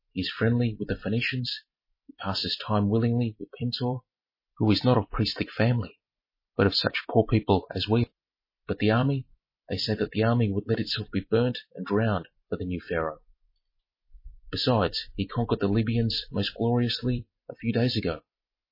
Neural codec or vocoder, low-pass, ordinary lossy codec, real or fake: vocoder, 44.1 kHz, 128 mel bands every 256 samples, BigVGAN v2; 5.4 kHz; MP3, 24 kbps; fake